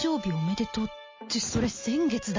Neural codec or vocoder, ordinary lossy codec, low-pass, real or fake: none; MP3, 32 kbps; 7.2 kHz; real